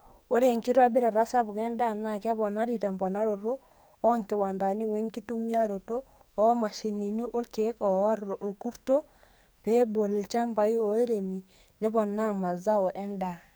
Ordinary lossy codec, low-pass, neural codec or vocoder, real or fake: none; none; codec, 44.1 kHz, 2.6 kbps, SNAC; fake